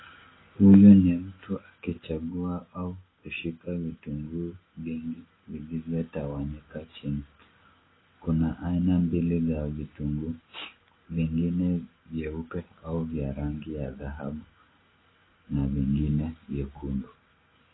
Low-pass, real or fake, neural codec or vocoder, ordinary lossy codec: 7.2 kHz; real; none; AAC, 16 kbps